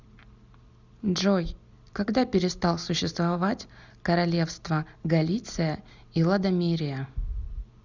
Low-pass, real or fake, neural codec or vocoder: 7.2 kHz; real; none